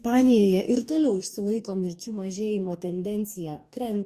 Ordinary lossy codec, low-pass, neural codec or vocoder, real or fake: Opus, 64 kbps; 14.4 kHz; codec, 44.1 kHz, 2.6 kbps, DAC; fake